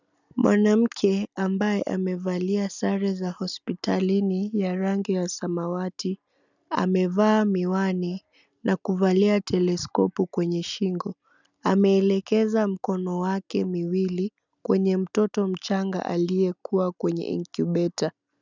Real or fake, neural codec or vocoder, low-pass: real; none; 7.2 kHz